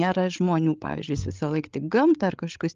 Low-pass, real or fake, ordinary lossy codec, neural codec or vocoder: 7.2 kHz; fake; Opus, 32 kbps; codec, 16 kHz, 8 kbps, FreqCodec, larger model